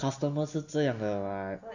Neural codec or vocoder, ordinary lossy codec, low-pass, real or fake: none; none; 7.2 kHz; real